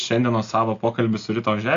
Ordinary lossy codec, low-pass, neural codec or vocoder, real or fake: AAC, 48 kbps; 7.2 kHz; none; real